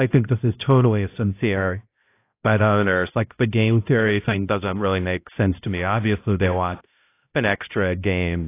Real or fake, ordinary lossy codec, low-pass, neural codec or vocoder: fake; AAC, 24 kbps; 3.6 kHz; codec, 16 kHz, 0.5 kbps, X-Codec, HuBERT features, trained on balanced general audio